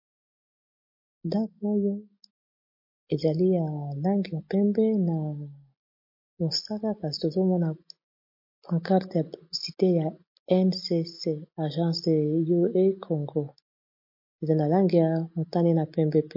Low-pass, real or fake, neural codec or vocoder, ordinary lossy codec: 5.4 kHz; real; none; MP3, 32 kbps